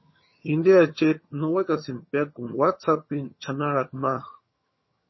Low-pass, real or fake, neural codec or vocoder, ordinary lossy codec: 7.2 kHz; fake; vocoder, 22.05 kHz, 80 mel bands, HiFi-GAN; MP3, 24 kbps